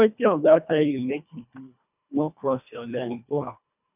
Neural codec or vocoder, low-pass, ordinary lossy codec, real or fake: codec, 24 kHz, 1.5 kbps, HILCodec; 3.6 kHz; none; fake